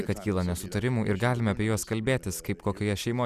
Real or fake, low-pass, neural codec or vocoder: real; 14.4 kHz; none